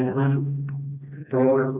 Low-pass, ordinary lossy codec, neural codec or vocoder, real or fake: 3.6 kHz; none; codec, 16 kHz, 1 kbps, FreqCodec, smaller model; fake